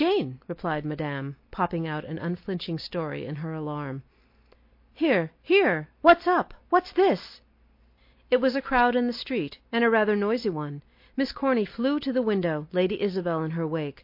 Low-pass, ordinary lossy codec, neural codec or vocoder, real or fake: 5.4 kHz; MP3, 32 kbps; none; real